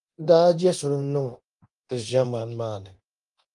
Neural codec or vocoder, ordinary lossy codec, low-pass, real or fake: codec, 24 kHz, 0.9 kbps, DualCodec; Opus, 32 kbps; 10.8 kHz; fake